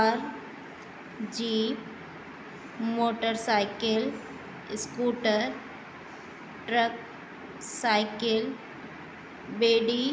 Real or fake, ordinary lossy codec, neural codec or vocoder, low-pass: real; none; none; none